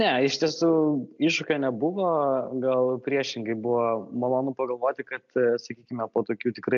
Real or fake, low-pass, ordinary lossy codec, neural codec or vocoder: real; 7.2 kHz; AAC, 64 kbps; none